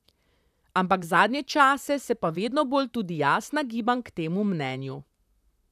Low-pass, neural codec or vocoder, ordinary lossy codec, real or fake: 14.4 kHz; vocoder, 44.1 kHz, 128 mel bands, Pupu-Vocoder; none; fake